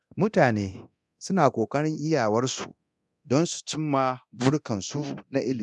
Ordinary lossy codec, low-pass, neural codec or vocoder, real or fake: none; none; codec, 24 kHz, 0.9 kbps, DualCodec; fake